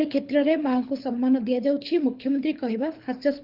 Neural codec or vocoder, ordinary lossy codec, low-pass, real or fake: codec, 24 kHz, 6 kbps, HILCodec; Opus, 32 kbps; 5.4 kHz; fake